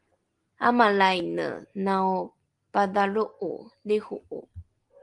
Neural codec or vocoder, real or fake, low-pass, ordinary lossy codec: none; real; 10.8 kHz; Opus, 24 kbps